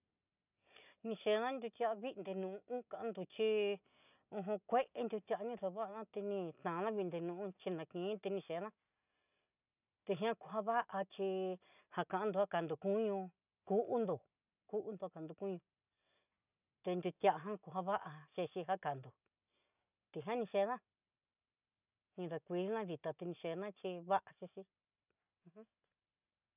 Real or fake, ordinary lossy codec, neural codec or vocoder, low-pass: real; none; none; 3.6 kHz